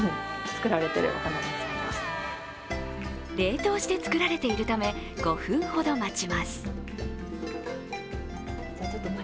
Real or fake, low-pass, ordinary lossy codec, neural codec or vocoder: real; none; none; none